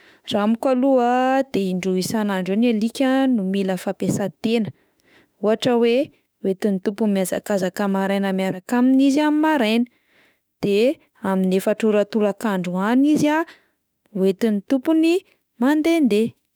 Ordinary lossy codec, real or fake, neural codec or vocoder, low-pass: none; fake; autoencoder, 48 kHz, 32 numbers a frame, DAC-VAE, trained on Japanese speech; none